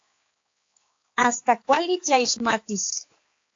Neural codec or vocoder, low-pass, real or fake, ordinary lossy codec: codec, 16 kHz, 4 kbps, X-Codec, HuBERT features, trained on balanced general audio; 7.2 kHz; fake; AAC, 48 kbps